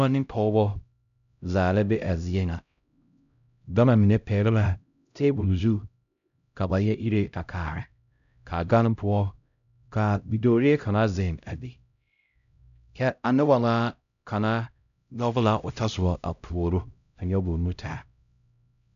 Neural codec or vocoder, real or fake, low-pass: codec, 16 kHz, 0.5 kbps, X-Codec, HuBERT features, trained on LibriSpeech; fake; 7.2 kHz